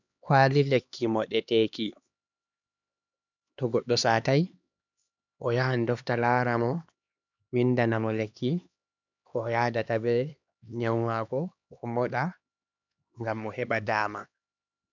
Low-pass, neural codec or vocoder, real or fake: 7.2 kHz; codec, 16 kHz, 2 kbps, X-Codec, HuBERT features, trained on LibriSpeech; fake